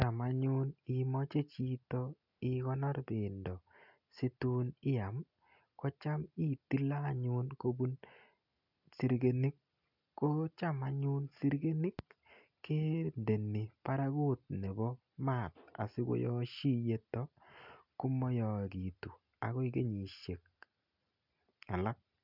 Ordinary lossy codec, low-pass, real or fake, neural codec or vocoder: none; 5.4 kHz; real; none